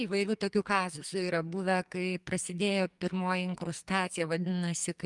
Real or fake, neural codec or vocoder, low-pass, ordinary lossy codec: fake; codec, 44.1 kHz, 2.6 kbps, SNAC; 10.8 kHz; Opus, 32 kbps